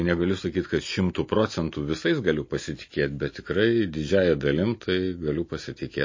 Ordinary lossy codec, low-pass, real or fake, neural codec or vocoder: MP3, 32 kbps; 7.2 kHz; real; none